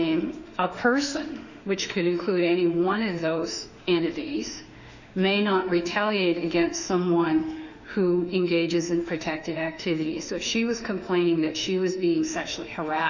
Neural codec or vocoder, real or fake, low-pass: autoencoder, 48 kHz, 32 numbers a frame, DAC-VAE, trained on Japanese speech; fake; 7.2 kHz